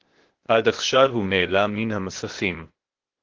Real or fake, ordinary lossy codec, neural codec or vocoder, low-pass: fake; Opus, 16 kbps; codec, 16 kHz, 0.8 kbps, ZipCodec; 7.2 kHz